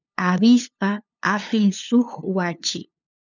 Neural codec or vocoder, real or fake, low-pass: codec, 16 kHz, 2 kbps, FunCodec, trained on LibriTTS, 25 frames a second; fake; 7.2 kHz